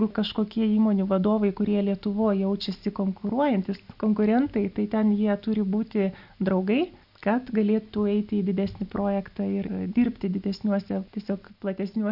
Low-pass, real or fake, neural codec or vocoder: 5.4 kHz; real; none